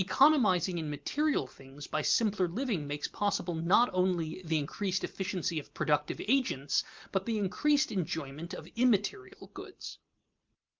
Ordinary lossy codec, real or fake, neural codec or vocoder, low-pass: Opus, 32 kbps; real; none; 7.2 kHz